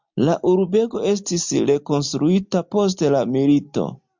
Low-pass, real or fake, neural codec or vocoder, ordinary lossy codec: 7.2 kHz; real; none; MP3, 64 kbps